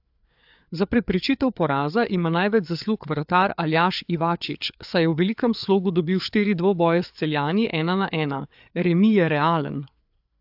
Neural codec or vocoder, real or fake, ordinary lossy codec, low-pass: codec, 16 kHz, 4 kbps, FreqCodec, larger model; fake; AAC, 48 kbps; 5.4 kHz